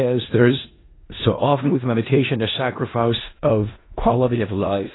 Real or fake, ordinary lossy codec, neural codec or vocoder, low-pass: fake; AAC, 16 kbps; codec, 16 kHz in and 24 kHz out, 0.4 kbps, LongCat-Audio-Codec, four codebook decoder; 7.2 kHz